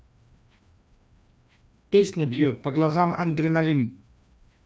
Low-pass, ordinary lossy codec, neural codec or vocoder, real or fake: none; none; codec, 16 kHz, 1 kbps, FreqCodec, larger model; fake